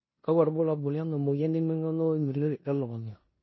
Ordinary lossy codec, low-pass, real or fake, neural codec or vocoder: MP3, 24 kbps; 7.2 kHz; fake; codec, 16 kHz in and 24 kHz out, 0.9 kbps, LongCat-Audio-Codec, four codebook decoder